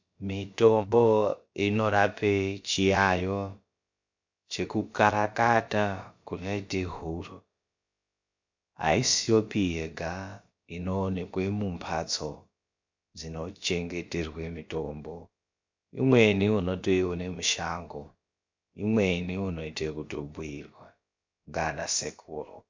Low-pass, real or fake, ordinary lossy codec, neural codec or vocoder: 7.2 kHz; fake; MP3, 64 kbps; codec, 16 kHz, about 1 kbps, DyCAST, with the encoder's durations